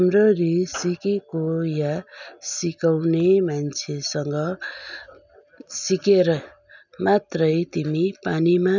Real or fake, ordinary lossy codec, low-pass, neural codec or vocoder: real; none; 7.2 kHz; none